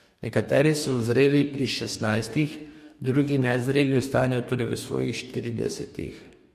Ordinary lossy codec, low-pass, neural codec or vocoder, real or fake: MP3, 64 kbps; 14.4 kHz; codec, 44.1 kHz, 2.6 kbps, DAC; fake